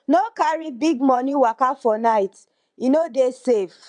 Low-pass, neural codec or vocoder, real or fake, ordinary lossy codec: 9.9 kHz; vocoder, 22.05 kHz, 80 mel bands, WaveNeXt; fake; none